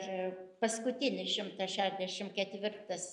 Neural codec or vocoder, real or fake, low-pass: none; real; 10.8 kHz